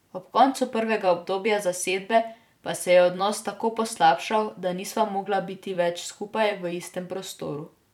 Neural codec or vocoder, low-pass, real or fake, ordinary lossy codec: vocoder, 44.1 kHz, 128 mel bands every 256 samples, BigVGAN v2; 19.8 kHz; fake; none